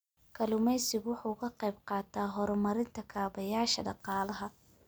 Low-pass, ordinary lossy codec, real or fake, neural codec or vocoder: none; none; real; none